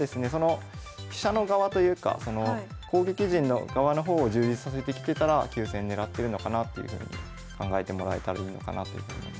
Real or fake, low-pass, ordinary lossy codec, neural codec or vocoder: real; none; none; none